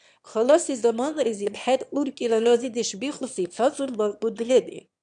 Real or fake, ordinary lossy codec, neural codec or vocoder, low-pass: fake; MP3, 96 kbps; autoencoder, 22.05 kHz, a latent of 192 numbers a frame, VITS, trained on one speaker; 9.9 kHz